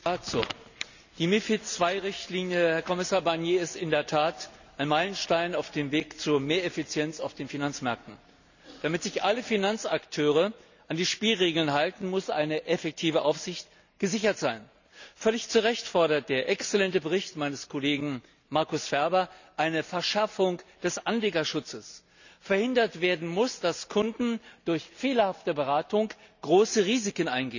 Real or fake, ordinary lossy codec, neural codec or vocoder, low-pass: real; none; none; 7.2 kHz